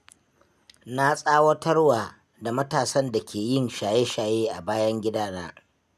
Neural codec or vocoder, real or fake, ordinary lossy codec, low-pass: none; real; none; 14.4 kHz